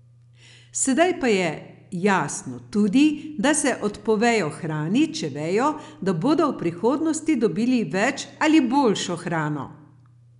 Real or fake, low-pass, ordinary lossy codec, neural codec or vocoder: real; 10.8 kHz; none; none